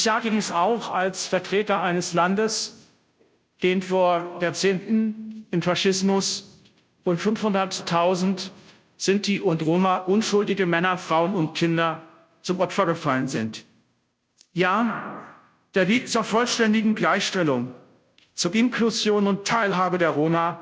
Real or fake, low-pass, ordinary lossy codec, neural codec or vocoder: fake; none; none; codec, 16 kHz, 0.5 kbps, FunCodec, trained on Chinese and English, 25 frames a second